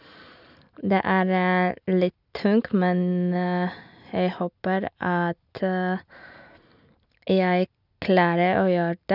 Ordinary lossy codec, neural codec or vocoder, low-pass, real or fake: none; none; 5.4 kHz; real